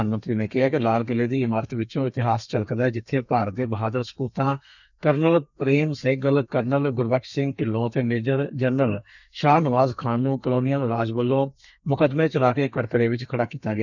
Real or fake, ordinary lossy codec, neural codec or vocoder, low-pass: fake; none; codec, 32 kHz, 1.9 kbps, SNAC; 7.2 kHz